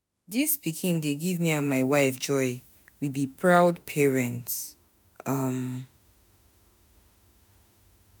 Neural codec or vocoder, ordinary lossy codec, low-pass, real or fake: autoencoder, 48 kHz, 32 numbers a frame, DAC-VAE, trained on Japanese speech; none; none; fake